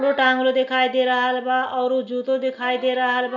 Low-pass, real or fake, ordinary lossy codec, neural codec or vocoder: 7.2 kHz; real; none; none